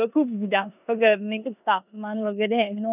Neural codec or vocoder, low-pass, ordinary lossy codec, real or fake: codec, 16 kHz in and 24 kHz out, 0.9 kbps, LongCat-Audio-Codec, four codebook decoder; 3.6 kHz; none; fake